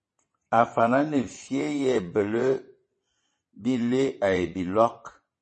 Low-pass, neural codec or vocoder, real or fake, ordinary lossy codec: 9.9 kHz; vocoder, 22.05 kHz, 80 mel bands, WaveNeXt; fake; MP3, 32 kbps